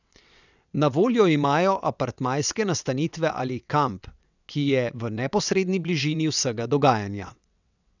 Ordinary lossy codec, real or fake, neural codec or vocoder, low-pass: none; fake; vocoder, 22.05 kHz, 80 mel bands, WaveNeXt; 7.2 kHz